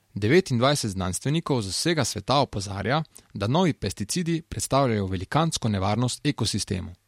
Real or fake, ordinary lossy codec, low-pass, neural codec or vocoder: real; MP3, 64 kbps; 19.8 kHz; none